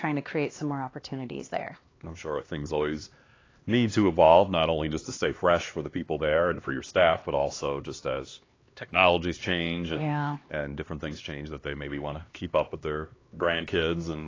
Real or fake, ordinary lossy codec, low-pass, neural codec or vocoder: fake; AAC, 32 kbps; 7.2 kHz; codec, 16 kHz, 2 kbps, X-Codec, HuBERT features, trained on LibriSpeech